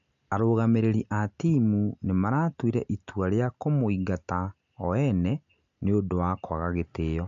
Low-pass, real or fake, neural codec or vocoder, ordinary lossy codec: 7.2 kHz; real; none; MP3, 64 kbps